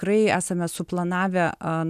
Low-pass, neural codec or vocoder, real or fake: 14.4 kHz; none; real